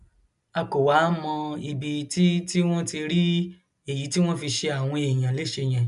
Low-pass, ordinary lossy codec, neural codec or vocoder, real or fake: 10.8 kHz; none; none; real